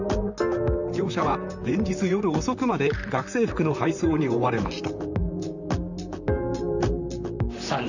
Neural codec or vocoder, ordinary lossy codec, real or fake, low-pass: vocoder, 44.1 kHz, 128 mel bands, Pupu-Vocoder; none; fake; 7.2 kHz